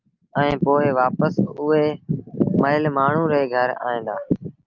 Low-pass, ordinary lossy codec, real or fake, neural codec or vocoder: 7.2 kHz; Opus, 24 kbps; real; none